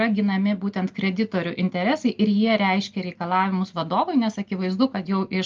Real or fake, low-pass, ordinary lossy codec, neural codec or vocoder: real; 7.2 kHz; Opus, 24 kbps; none